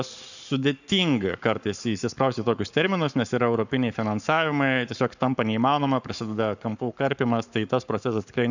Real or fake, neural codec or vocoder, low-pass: fake; codec, 44.1 kHz, 7.8 kbps, Pupu-Codec; 7.2 kHz